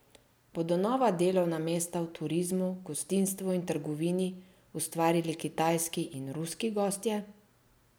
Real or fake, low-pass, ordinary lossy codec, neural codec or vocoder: real; none; none; none